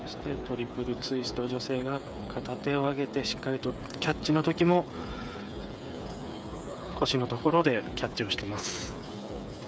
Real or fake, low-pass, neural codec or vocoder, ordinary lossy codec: fake; none; codec, 16 kHz, 8 kbps, FreqCodec, smaller model; none